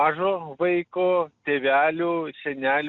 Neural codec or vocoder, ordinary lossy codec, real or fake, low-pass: none; Opus, 64 kbps; real; 7.2 kHz